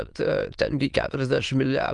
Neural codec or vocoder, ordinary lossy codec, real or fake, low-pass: autoencoder, 22.05 kHz, a latent of 192 numbers a frame, VITS, trained on many speakers; Opus, 32 kbps; fake; 9.9 kHz